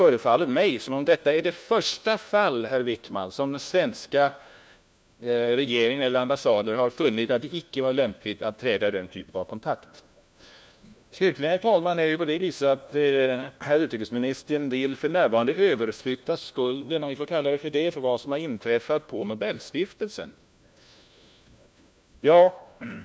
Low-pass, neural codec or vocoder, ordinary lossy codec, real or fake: none; codec, 16 kHz, 1 kbps, FunCodec, trained on LibriTTS, 50 frames a second; none; fake